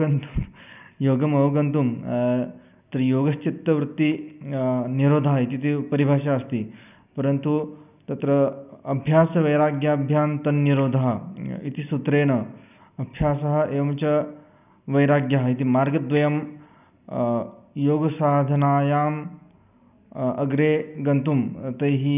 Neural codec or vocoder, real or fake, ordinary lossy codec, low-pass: none; real; none; 3.6 kHz